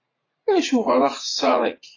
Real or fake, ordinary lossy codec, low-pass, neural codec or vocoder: fake; AAC, 32 kbps; 7.2 kHz; vocoder, 44.1 kHz, 80 mel bands, Vocos